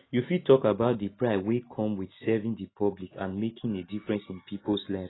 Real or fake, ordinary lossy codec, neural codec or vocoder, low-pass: real; AAC, 16 kbps; none; 7.2 kHz